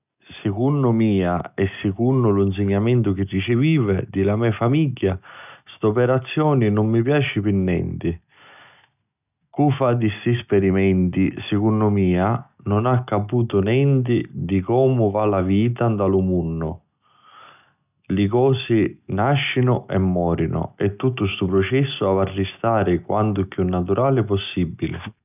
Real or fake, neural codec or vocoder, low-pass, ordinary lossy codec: real; none; 3.6 kHz; none